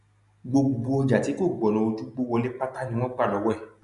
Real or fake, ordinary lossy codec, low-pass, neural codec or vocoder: real; none; 10.8 kHz; none